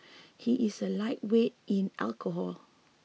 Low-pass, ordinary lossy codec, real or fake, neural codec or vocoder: none; none; real; none